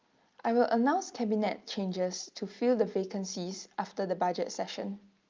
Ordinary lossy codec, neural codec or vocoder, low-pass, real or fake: Opus, 24 kbps; none; 7.2 kHz; real